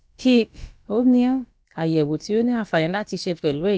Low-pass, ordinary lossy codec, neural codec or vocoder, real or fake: none; none; codec, 16 kHz, about 1 kbps, DyCAST, with the encoder's durations; fake